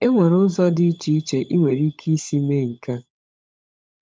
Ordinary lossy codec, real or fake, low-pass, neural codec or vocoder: none; fake; none; codec, 16 kHz, 16 kbps, FunCodec, trained on LibriTTS, 50 frames a second